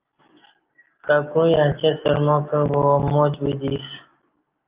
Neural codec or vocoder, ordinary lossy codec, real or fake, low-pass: none; Opus, 24 kbps; real; 3.6 kHz